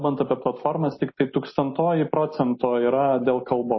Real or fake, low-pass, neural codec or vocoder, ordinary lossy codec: real; 7.2 kHz; none; MP3, 24 kbps